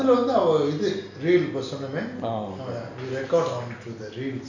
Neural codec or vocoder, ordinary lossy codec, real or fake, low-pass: none; none; real; 7.2 kHz